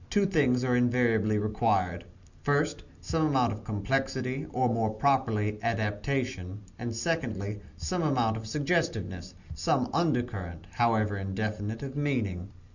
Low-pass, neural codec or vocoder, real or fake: 7.2 kHz; none; real